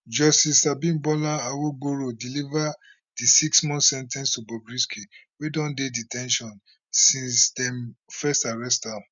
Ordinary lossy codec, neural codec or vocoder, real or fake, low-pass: none; none; real; 7.2 kHz